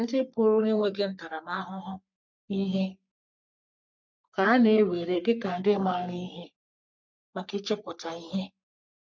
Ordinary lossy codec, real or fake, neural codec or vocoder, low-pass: MP3, 64 kbps; fake; codec, 44.1 kHz, 3.4 kbps, Pupu-Codec; 7.2 kHz